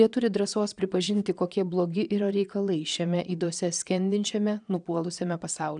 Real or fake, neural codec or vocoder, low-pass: fake; vocoder, 22.05 kHz, 80 mel bands, Vocos; 9.9 kHz